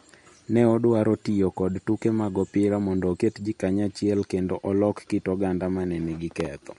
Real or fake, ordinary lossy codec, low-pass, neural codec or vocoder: real; MP3, 48 kbps; 19.8 kHz; none